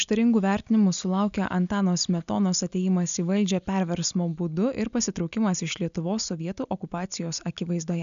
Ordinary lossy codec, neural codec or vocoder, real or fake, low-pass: MP3, 96 kbps; none; real; 7.2 kHz